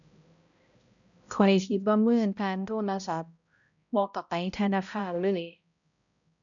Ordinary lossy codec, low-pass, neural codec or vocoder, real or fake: none; 7.2 kHz; codec, 16 kHz, 0.5 kbps, X-Codec, HuBERT features, trained on balanced general audio; fake